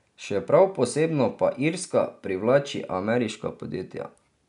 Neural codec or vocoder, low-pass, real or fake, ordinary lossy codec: none; 10.8 kHz; real; none